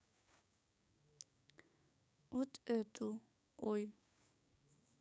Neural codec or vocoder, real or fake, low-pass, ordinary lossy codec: codec, 16 kHz, 6 kbps, DAC; fake; none; none